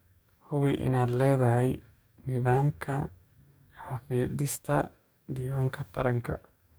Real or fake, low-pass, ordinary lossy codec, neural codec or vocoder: fake; none; none; codec, 44.1 kHz, 2.6 kbps, DAC